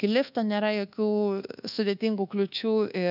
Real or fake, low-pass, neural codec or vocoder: fake; 5.4 kHz; codec, 24 kHz, 1.2 kbps, DualCodec